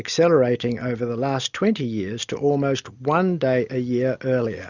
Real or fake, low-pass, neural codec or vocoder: real; 7.2 kHz; none